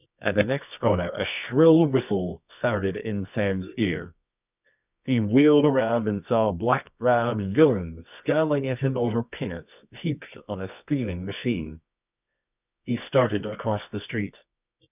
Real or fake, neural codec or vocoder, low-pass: fake; codec, 24 kHz, 0.9 kbps, WavTokenizer, medium music audio release; 3.6 kHz